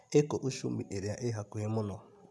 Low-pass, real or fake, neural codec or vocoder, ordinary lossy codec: none; real; none; none